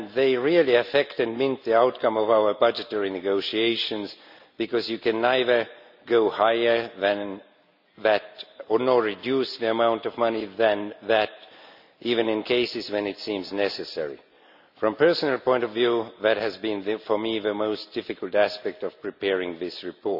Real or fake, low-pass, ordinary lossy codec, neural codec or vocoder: real; 5.4 kHz; none; none